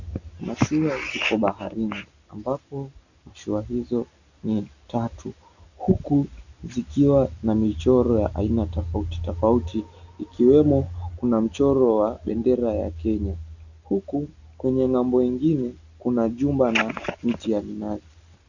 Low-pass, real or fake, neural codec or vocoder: 7.2 kHz; real; none